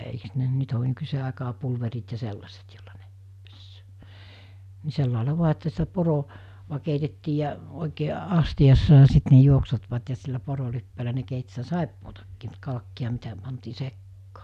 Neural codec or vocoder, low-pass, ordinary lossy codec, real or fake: none; 14.4 kHz; none; real